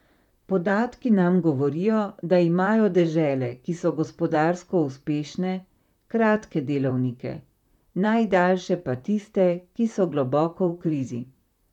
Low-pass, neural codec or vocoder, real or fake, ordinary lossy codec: 19.8 kHz; vocoder, 44.1 kHz, 128 mel bands, Pupu-Vocoder; fake; none